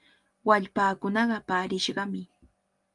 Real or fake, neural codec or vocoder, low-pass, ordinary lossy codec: real; none; 10.8 kHz; Opus, 32 kbps